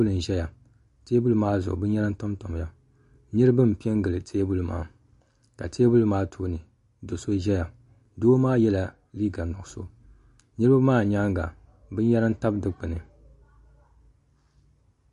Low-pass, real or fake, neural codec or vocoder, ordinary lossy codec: 14.4 kHz; real; none; MP3, 48 kbps